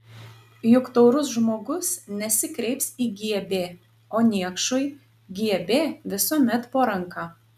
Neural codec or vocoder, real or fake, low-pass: none; real; 14.4 kHz